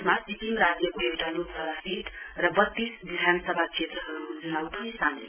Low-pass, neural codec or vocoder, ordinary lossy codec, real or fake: 3.6 kHz; none; none; real